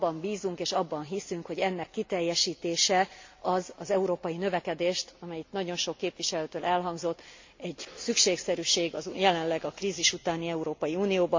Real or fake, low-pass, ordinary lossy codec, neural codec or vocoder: real; 7.2 kHz; MP3, 48 kbps; none